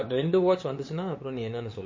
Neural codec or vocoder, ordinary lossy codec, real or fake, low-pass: codec, 16 kHz, 8 kbps, FunCodec, trained on LibriTTS, 25 frames a second; MP3, 32 kbps; fake; 7.2 kHz